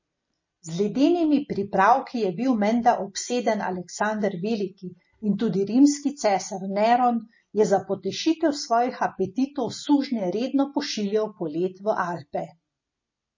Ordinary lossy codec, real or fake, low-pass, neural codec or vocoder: MP3, 32 kbps; real; 7.2 kHz; none